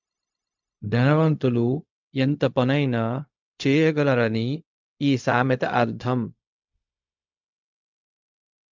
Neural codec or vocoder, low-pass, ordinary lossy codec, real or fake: codec, 16 kHz, 0.4 kbps, LongCat-Audio-Codec; 7.2 kHz; MP3, 64 kbps; fake